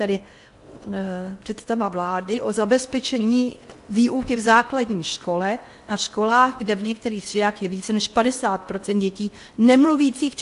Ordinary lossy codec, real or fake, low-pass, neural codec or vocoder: AAC, 64 kbps; fake; 10.8 kHz; codec, 16 kHz in and 24 kHz out, 0.8 kbps, FocalCodec, streaming, 65536 codes